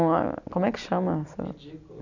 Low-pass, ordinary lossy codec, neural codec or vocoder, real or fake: 7.2 kHz; none; none; real